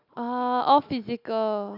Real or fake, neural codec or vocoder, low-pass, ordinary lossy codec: real; none; 5.4 kHz; none